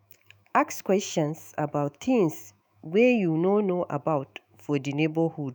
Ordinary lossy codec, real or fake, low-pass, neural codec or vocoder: none; fake; none; autoencoder, 48 kHz, 128 numbers a frame, DAC-VAE, trained on Japanese speech